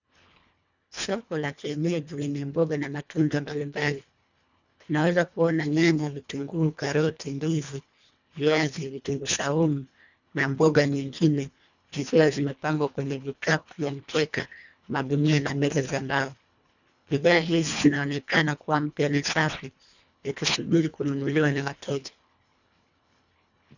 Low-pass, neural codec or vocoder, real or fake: 7.2 kHz; codec, 24 kHz, 1.5 kbps, HILCodec; fake